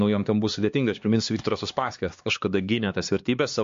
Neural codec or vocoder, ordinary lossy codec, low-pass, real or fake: codec, 16 kHz, 2 kbps, X-Codec, HuBERT features, trained on LibriSpeech; MP3, 48 kbps; 7.2 kHz; fake